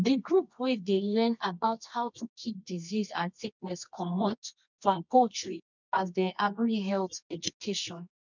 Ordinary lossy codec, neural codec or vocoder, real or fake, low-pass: none; codec, 24 kHz, 0.9 kbps, WavTokenizer, medium music audio release; fake; 7.2 kHz